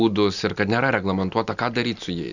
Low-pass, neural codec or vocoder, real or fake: 7.2 kHz; none; real